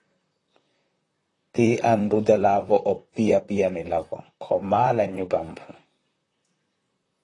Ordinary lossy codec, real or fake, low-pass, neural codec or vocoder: AAC, 32 kbps; fake; 10.8 kHz; vocoder, 44.1 kHz, 128 mel bands, Pupu-Vocoder